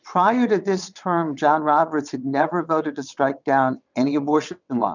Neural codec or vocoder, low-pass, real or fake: vocoder, 22.05 kHz, 80 mel bands, WaveNeXt; 7.2 kHz; fake